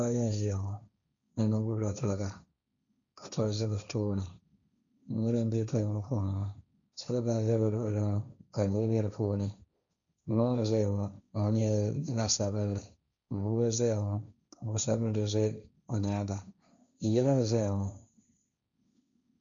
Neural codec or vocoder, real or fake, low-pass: codec, 16 kHz, 1.1 kbps, Voila-Tokenizer; fake; 7.2 kHz